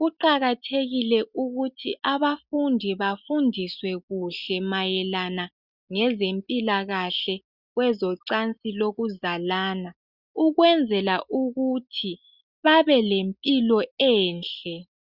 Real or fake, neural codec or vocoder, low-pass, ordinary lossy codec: real; none; 5.4 kHz; Opus, 64 kbps